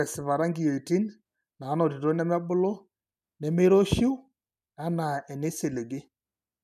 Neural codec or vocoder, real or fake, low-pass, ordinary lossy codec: none; real; 14.4 kHz; none